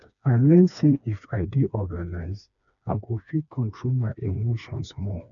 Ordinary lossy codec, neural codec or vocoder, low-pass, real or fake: none; codec, 16 kHz, 2 kbps, FreqCodec, smaller model; 7.2 kHz; fake